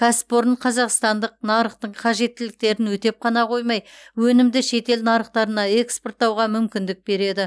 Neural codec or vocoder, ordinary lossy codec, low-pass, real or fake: none; none; none; real